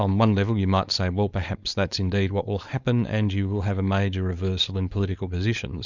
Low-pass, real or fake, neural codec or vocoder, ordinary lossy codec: 7.2 kHz; fake; codec, 16 kHz, 4.8 kbps, FACodec; Opus, 64 kbps